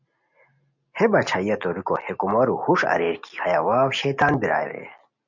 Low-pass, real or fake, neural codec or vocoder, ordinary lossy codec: 7.2 kHz; real; none; MP3, 48 kbps